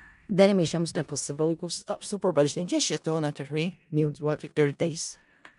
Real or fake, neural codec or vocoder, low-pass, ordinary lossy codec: fake; codec, 16 kHz in and 24 kHz out, 0.4 kbps, LongCat-Audio-Codec, four codebook decoder; 10.8 kHz; MP3, 96 kbps